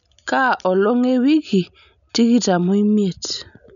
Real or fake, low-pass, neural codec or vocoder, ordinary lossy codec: real; 7.2 kHz; none; none